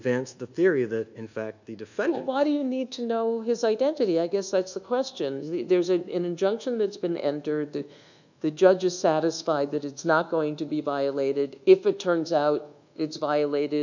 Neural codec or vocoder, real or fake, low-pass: codec, 24 kHz, 1.2 kbps, DualCodec; fake; 7.2 kHz